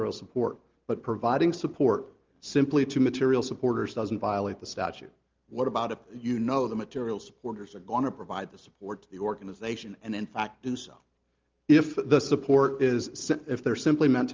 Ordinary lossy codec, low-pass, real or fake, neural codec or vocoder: Opus, 32 kbps; 7.2 kHz; real; none